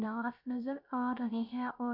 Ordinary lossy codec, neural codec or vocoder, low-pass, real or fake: none; codec, 16 kHz, 0.3 kbps, FocalCodec; 5.4 kHz; fake